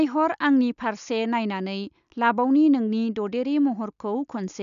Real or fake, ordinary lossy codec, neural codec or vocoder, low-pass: real; none; none; 7.2 kHz